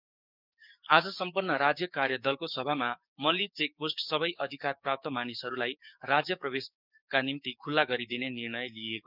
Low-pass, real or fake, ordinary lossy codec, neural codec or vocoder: 5.4 kHz; fake; none; codec, 16 kHz, 6 kbps, DAC